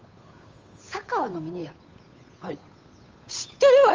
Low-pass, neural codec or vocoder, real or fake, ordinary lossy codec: 7.2 kHz; codec, 16 kHz, 8 kbps, FunCodec, trained on Chinese and English, 25 frames a second; fake; Opus, 32 kbps